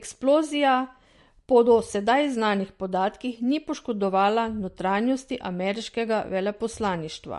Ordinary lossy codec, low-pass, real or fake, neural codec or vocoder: MP3, 48 kbps; 14.4 kHz; real; none